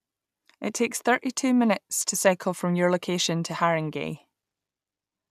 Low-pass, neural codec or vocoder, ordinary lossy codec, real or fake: 14.4 kHz; none; none; real